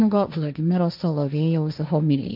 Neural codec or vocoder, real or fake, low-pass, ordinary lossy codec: codec, 16 kHz, 1.1 kbps, Voila-Tokenizer; fake; 5.4 kHz; MP3, 48 kbps